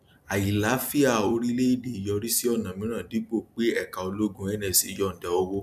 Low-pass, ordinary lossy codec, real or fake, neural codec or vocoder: 14.4 kHz; none; real; none